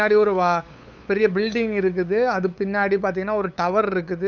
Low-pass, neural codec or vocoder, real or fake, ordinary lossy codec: 7.2 kHz; codec, 16 kHz, 8 kbps, FunCodec, trained on LibriTTS, 25 frames a second; fake; none